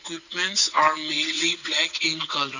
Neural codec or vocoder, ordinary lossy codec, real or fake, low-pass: vocoder, 22.05 kHz, 80 mel bands, WaveNeXt; none; fake; 7.2 kHz